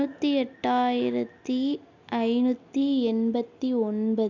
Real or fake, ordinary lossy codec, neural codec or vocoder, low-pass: real; none; none; 7.2 kHz